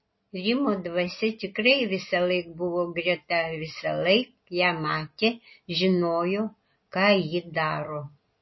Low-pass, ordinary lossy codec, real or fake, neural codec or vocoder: 7.2 kHz; MP3, 24 kbps; real; none